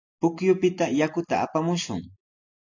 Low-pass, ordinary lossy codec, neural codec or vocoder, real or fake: 7.2 kHz; AAC, 48 kbps; none; real